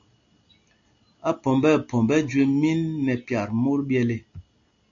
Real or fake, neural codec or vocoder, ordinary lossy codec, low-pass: real; none; AAC, 48 kbps; 7.2 kHz